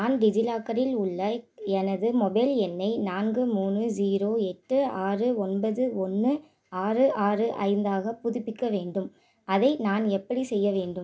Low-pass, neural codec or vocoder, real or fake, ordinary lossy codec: none; none; real; none